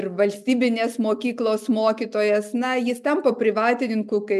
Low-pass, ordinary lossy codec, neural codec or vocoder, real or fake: 14.4 kHz; MP3, 96 kbps; none; real